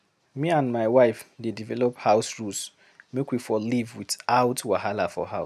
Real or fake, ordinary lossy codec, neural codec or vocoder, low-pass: real; none; none; 14.4 kHz